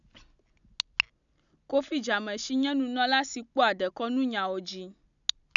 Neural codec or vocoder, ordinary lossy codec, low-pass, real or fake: none; none; 7.2 kHz; real